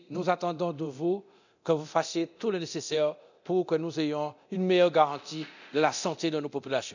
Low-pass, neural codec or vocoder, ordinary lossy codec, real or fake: 7.2 kHz; codec, 24 kHz, 0.9 kbps, DualCodec; none; fake